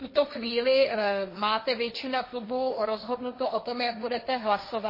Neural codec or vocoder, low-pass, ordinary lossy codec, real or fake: codec, 16 kHz, 1.1 kbps, Voila-Tokenizer; 5.4 kHz; MP3, 24 kbps; fake